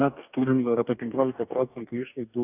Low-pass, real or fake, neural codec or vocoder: 3.6 kHz; fake; codec, 44.1 kHz, 2.6 kbps, DAC